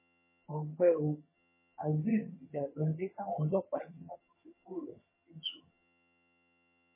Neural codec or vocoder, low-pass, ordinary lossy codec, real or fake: vocoder, 22.05 kHz, 80 mel bands, HiFi-GAN; 3.6 kHz; MP3, 16 kbps; fake